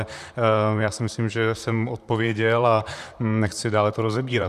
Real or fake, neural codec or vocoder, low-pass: fake; vocoder, 44.1 kHz, 128 mel bands, Pupu-Vocoder; 14.4 kHz